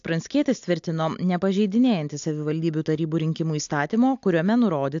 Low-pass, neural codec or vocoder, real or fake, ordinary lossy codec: 7.2 kHz; none; real; AAC, 64 kbps